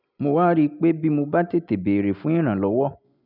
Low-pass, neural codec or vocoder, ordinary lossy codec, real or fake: 5.4 kHz; none; none; real